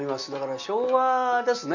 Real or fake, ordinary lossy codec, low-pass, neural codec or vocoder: real; none; 7.2 kHz; none